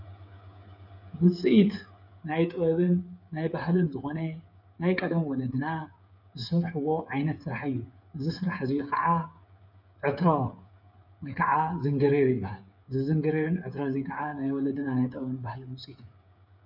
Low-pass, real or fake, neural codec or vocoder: 5.4 kHz; fake; codec, 16 kHz, 8 kbps, FreqCodec, smaller model